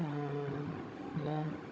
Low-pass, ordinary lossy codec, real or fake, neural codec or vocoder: none; none; fake; codec, 16 kHz, 16 kbps, FunCodec, trained on Chinese and English, 50 frames a second